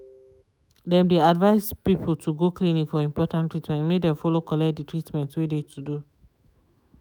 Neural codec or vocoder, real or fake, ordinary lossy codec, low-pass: autoencoder, 48 kHz, 128 numbers a frame, DAC-VAE, trained on Japanese speech; fake; none; none